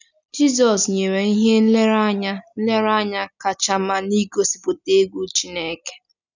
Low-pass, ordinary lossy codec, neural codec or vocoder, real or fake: 7.2 kHz; none; none; real